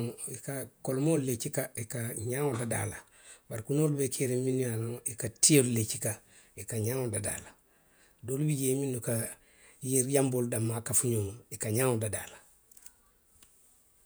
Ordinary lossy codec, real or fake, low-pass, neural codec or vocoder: none; fake; none; vocoder, 48 kHz, 128 mel bands, Vocos